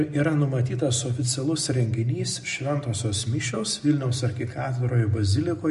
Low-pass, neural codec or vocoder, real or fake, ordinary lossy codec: 14.4 kHz; none; real; MP3, 48 kbps